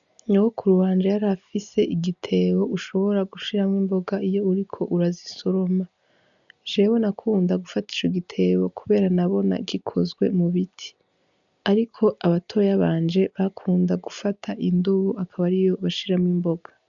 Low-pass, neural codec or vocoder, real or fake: 7.2 kHz; none; real